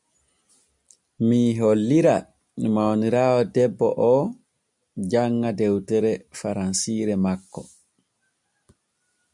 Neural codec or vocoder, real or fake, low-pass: none; real; 10.8 kHz